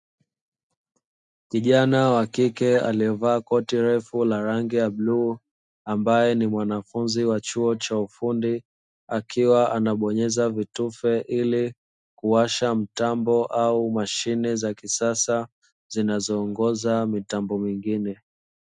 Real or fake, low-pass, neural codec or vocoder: real; 10.8 kHz; none